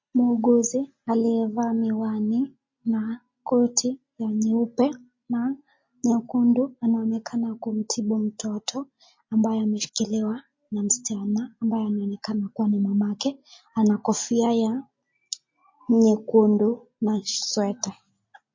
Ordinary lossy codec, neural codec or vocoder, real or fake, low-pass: MP3, 32 kbps; none; real; 7.2 kHz